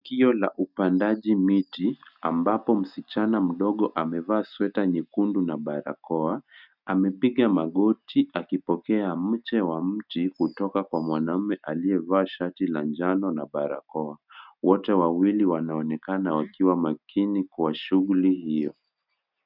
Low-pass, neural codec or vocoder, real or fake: 5.4 kHz; none; real